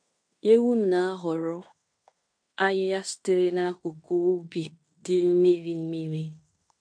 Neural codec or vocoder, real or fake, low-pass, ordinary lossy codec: codec, 16 kHz in and 24 kHz out, 0.9 kbps, LongCat-Audio-Codec, fine tuned four codebook decoder; fake; 9.9 kHz; MP3, 64 kbps